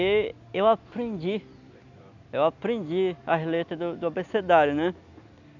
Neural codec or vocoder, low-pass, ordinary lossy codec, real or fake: none; 7.2 kHz; none; real